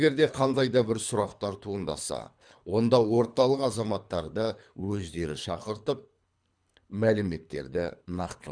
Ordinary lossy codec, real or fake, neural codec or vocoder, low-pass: MP3, 96 kbps; fake; codec, 24 kHz, 3 kbps, HILCodec; 9.9 kHz